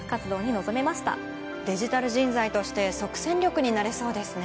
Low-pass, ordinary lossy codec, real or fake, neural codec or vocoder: none; none; real; none